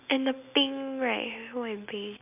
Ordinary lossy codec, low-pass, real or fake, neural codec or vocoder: none; 3.6 kHz; real; none